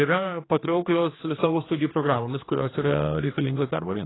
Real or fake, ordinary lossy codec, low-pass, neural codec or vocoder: fake; AAC, 16 kbps; 7.2 kHz; codec, 16 kHz, 2 kbps, X-Codec, HuBERT features, trained on general audio